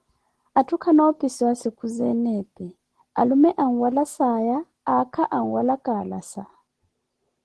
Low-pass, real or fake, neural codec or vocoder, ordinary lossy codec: 10.8 kHz; real; none; Opus, 16 kbps